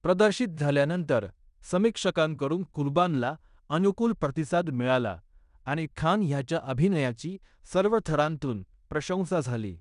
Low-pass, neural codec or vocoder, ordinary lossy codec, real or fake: 10.8 kHz; codec, 16 kHz in and 24 kHz out, 0.9 kbps, LongCat-Audio-Codec, fine tuned four codebook decoder; none; fake